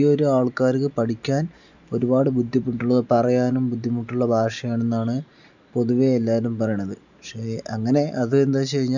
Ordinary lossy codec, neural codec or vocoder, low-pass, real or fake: none; none; 7.2 kHz; real